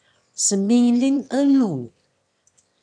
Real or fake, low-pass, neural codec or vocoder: fake; 9.9 kHz; autoencoder, 22.05 kHz, a latent of 192 numbers a frame, VITS, trained on one speaker